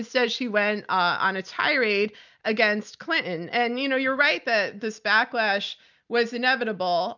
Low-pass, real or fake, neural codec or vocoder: 7.2 kHz; real; none